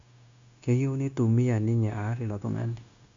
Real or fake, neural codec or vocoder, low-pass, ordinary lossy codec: fake; codec, 16 kHz, 0.9 kbps, LongCat-Audio-Codec; 7.2 kHz; MP3, 64 kbps